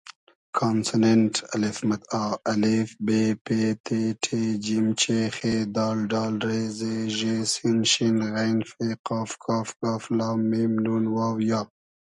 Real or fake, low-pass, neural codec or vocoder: real; 10.8 kHz; none